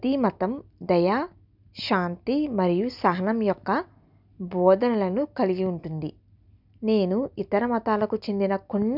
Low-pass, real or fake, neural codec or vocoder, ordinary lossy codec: 5.4 kHz; real; none; none